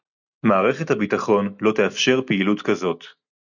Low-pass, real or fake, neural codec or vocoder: 7.2 kHz; real; none